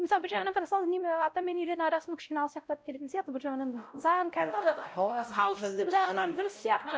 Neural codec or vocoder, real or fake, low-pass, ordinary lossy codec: codec, 16 kHz, 0.5 kbps, X-Codec, WavLM features, trained on Multilingual LibriSpeech; fake; none; none